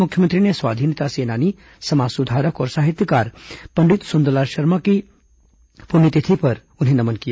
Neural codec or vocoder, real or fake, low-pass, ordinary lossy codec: none; real; none; none